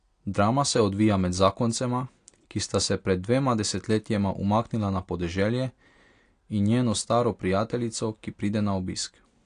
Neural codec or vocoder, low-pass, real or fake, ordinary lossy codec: none; 9.9 kHz; real; AAC, 48 kbps